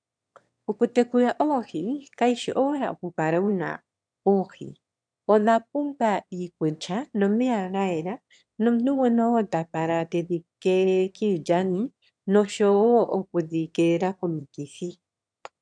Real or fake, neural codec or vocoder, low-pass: fake; autoencoder, 22.05 kHz, a latent of 192 numbers a frame, VITS, trained on one speaker; 9.9 kHz